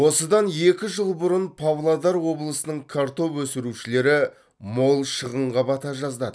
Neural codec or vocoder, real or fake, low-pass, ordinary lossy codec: none; real; none; none